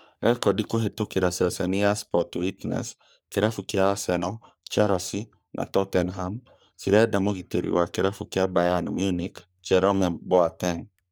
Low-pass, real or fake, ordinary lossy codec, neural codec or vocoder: none; fake; none; codec, 44.1 kHz, 3.4 kbps, Pupu-Codec